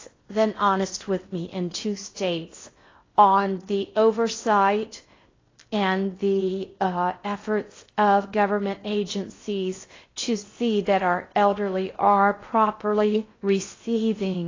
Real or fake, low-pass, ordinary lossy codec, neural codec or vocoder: fake; 7.2 kHz; AAC, 32 kbps; codec, 16 kHz in and 24 kHz out, 0.6 kbps, FocalCodec, streaming, 4096 codes